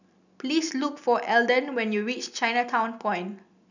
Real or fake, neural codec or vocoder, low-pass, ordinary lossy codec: real; none; 7.2 kHz; none